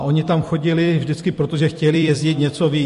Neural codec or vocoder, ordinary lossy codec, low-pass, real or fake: vocoder, 44.1 kHz, 128 mel bands every 256 samples, BigVGAN v2; MP3, 48 kbps; 14.4 kHz; fake